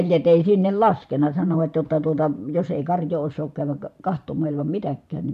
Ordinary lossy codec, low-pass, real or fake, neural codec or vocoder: AAC, 96 kbps; 14.4 kHz; fake; vocoder, 44.1 kHz, 128 mel bands every 512 samples, BigVGAN v2